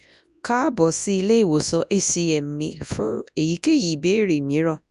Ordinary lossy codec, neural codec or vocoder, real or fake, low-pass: none; codec, 24 kHz, 0.9 kbps, WavTokenizer, large speech release; fake; 10.8 kHz